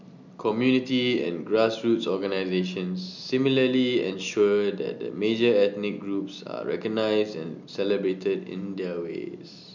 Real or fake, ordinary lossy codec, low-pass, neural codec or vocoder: real; none; 7.2 kHz; none